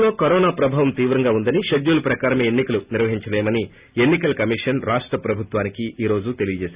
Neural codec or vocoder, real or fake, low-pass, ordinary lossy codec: none; real; 3.6 kHz; Opus, 64 kbps